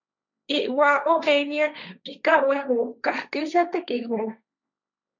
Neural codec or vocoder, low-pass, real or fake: codec, 16 kHz, 1.1 kbps, Voila-Tokenizer; 7.2 kHz; fake